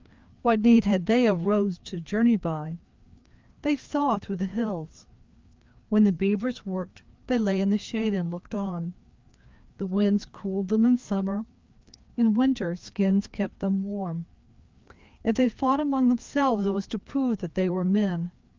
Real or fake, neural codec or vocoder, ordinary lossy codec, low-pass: fake; codec, 16 kHz, 2 kbps, FreqCodec, larger model; Opus, 24 kbps; 7.2 kHz